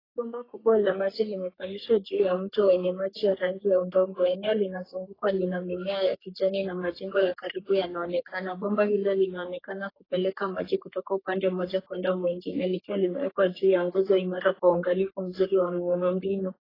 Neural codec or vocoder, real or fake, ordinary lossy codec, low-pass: codec, 44.1 kHz, 3.4 kbps, Pupu-Codec; fake; AAC, 24 kbps; 5.4 kHz